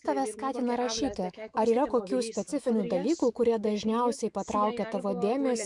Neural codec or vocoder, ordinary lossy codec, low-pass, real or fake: autoencoder, 48 kHz, 128 numbers a frame, DAC-VAE, trained on Japanese speech; Opus, 64 kbps; 10.8 kHz; fake